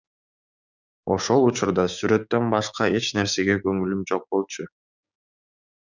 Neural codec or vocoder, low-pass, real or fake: codec, 16 kHz, 6 kbps, DAC; 7.2 kHz; fake